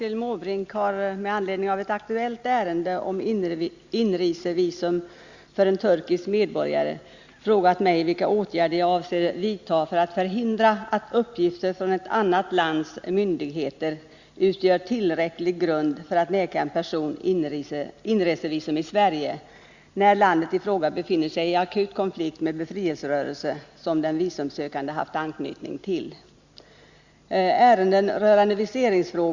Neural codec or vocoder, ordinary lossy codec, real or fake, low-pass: none; Opus, 64 kbps; real; 7.2 kHz